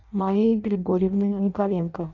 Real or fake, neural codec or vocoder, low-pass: fake; codec, 16 kHz in and 24 kHz out, 0.6 kbps, FireRedTTS-2 codec; 7.2 kHz